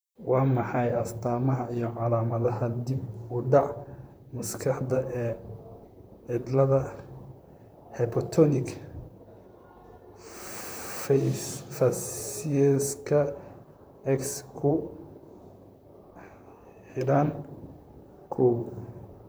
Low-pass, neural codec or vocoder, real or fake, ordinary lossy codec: none; vocoder, 44.1 kHz, 128 mel bands, Pupu-Vocoder; fake; none